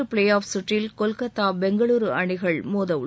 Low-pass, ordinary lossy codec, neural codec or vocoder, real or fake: none; none; none; real